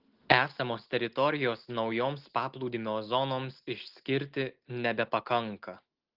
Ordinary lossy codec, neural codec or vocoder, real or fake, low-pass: Opus, 16 kbps; none; real; 5.4 kHz